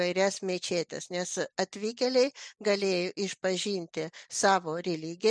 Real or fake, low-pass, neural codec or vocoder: real; 9.9 kHz; none